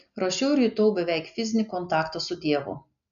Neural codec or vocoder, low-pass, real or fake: none; 7.2 kHz; real